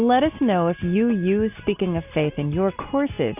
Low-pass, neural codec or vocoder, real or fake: 3.6 kHz; none; real